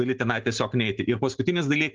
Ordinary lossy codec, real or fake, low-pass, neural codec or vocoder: Opus, 16 kbps; real; 7.2 kHz; none